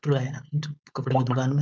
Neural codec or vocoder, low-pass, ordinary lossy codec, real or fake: codec, 16 kHz, 4.8 kbps, FACodec; none; none; fake